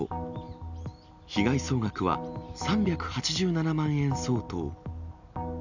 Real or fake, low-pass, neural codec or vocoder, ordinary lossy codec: real; 7.2 kHz; none; none